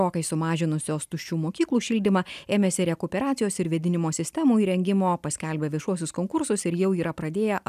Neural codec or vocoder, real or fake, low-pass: none; real; 14.4 kHz